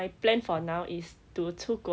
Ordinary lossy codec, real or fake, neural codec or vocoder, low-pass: none; real; none; none